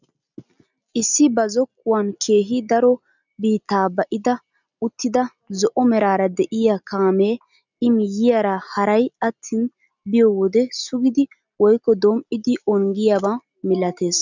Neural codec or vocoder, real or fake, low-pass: none; real; 7.2 kHz